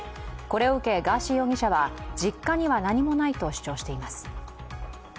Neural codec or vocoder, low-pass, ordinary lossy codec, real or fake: none; none; none; real